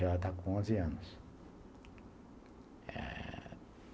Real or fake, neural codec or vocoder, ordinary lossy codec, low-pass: real; none; none; none